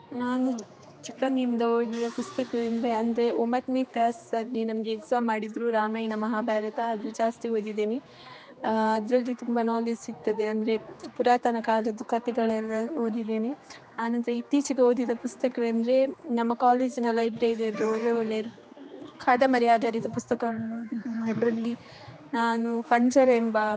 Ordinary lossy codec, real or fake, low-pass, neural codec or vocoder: none; fake; none; codec, 16 kHz, 2 kbps, X-Codec, HuBERT features, trained on general audio